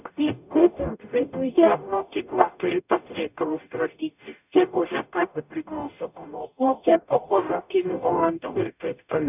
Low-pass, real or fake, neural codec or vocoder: 3.6 kHz; fake; codec, 44.1 kHz, 0.9 kbps, DAC